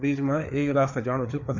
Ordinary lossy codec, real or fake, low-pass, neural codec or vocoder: none; fake; 7.2 kHz; codec, 16 kHz, 4 kbps, FreqCodec, larger model